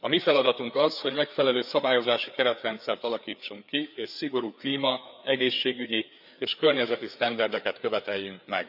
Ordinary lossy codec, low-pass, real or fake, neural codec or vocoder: none; 5.4 kHz; fake; codec, 16 kHz, 4 kbps, FreqCodec, larger model